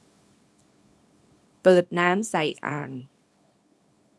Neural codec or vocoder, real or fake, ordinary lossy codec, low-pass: codec, 24 kHz, 0.9 kbps, WavTokenizer, small release; fake; none; none